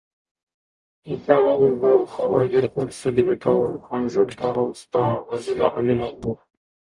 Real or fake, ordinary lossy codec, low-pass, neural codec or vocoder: fake; Opus, 64 kbps; 10.8 kHz; codec, 44.1 kHz, 0.9 kbps, DAC